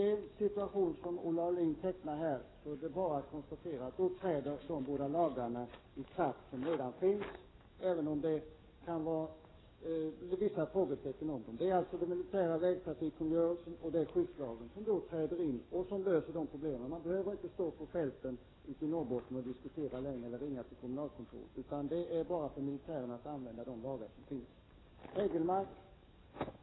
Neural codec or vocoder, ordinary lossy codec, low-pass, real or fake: codec, 44.1 kHz, 7.8 kbps, DAC; AAC, 16 kbps; 7.2 kHz; fake